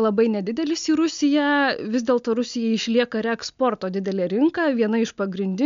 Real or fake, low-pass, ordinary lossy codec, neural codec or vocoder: real; 7.2 kHz; MP3, 64 kbps; none